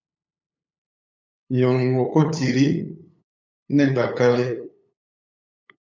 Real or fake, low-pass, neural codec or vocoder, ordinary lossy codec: fake; 7.2 kHz; codec, 16 kHz, 8 kbps, FunCodec, trained on LibriTTS, 25 frames a second; MP3, 64 kbps